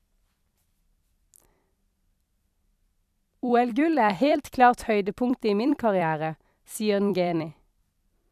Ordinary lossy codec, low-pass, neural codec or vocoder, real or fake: none; 14.4 kHz; vocoder, 44.1 kHz, 128 mel bands every 256 samples, BigVGAN v2; fake